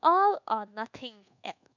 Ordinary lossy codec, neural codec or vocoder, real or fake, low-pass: none; codec, 24 kHz, 1.2 kbps, DualCodec; fake; 7.2 kHz